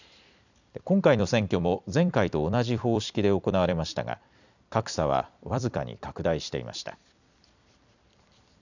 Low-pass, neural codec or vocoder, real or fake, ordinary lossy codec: 7.2 kHz; vocoder, 44.1 kHz, 128 mel bands every 256 samples, BigVGAN v2; fake; none